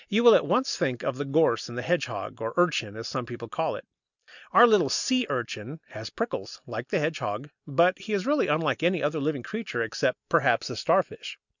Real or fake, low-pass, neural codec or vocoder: real; 7.2 kHz; none